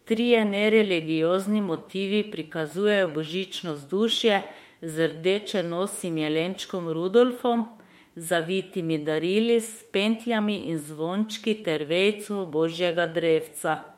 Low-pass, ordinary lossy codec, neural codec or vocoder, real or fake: 19.8 kHz; MP3, 64 kbps; autoencoder, 48 kHz, 32 numbers a frame, DAC-VAE, trained on Japanese speech; fake